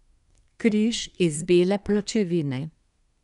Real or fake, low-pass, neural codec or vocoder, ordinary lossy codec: fake; 10.8 kHz; codec, 24 kHz, 1 kbps, SNAC; none